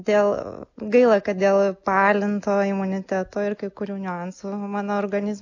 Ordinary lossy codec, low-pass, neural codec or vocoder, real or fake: AAC, 48 kbps; 7.2 kHz; none; real